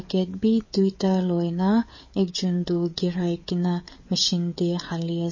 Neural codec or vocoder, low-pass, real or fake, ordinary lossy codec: codec, 16 kHz, 16 kbps, FreqCodec, smaller model; 7.2 kHz; fake; MP3, 32 kbps